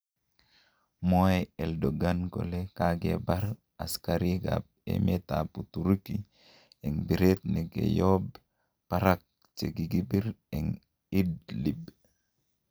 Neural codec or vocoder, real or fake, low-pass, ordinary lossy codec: none; real; none; none